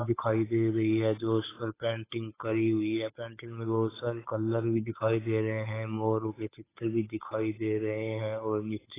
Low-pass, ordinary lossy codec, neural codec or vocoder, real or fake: 3.6 kHz; AAC, 16 kbps; codec, 16 kHz, 8 kbps, FunCodec, trained on Chinese and English, 25 frames a second; fake